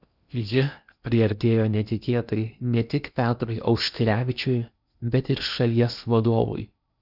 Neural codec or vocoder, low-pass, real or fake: codec, 16 kHz in and 24 kHz out, 0.8 kbps, FocalCodec, streaming, 65536 codes; 5.4 kHz; fake